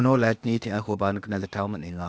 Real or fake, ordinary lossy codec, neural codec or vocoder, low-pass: fake; none; codec, 16 kHz, 0.8 kbps, ZipCodec; none